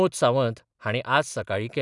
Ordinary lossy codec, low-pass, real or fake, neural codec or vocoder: none; 10.8 kHz; real; none